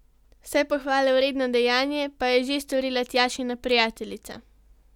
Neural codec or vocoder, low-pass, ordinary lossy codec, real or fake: none; 19.8 kHz; none; real